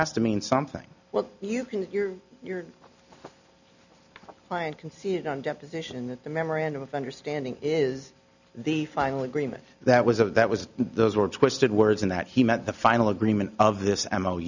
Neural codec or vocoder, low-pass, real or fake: none; 7.2 kHz; real